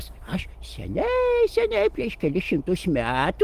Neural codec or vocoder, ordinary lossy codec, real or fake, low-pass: none; Opus, 24 kbps; real; 14.4 kHz